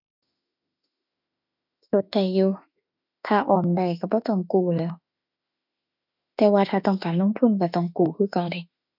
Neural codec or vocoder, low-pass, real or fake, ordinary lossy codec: autoencoder, 48 kHz, 32 numbers a frame, DAC-VAE, trained on Japanese speech; 5.4 kHz; fake; none